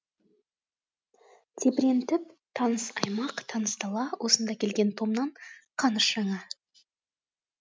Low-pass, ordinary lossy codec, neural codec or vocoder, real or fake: none; none; none; real